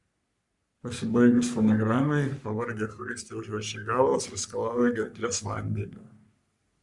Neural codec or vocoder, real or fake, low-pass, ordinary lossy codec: codec, 44.1 kHz, 3.4 kbps, Pupu-Codec; fake; 10.8 kHz; Opus, 64 kbps